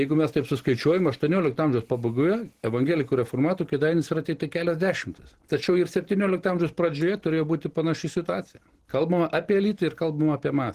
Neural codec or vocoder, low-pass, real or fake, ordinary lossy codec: none; 14.4 kHz; real; Opus, 16 kbps